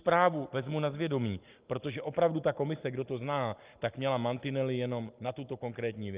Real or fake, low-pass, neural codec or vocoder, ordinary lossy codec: real; 3.6 kHz; none; Opus, 24 kbps